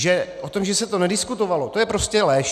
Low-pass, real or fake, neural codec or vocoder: 14.4 kHz; real; none